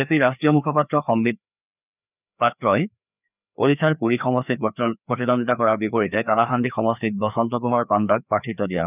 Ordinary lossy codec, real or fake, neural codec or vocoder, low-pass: none; fake; codec, 16 kHz, 2 kbps, FreqCodec, larger model; 3.6 kHz